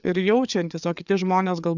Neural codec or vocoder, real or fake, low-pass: codec, 16 kHz, 4 kbps, FreqCodec, larger model; fake; 7.2 kHz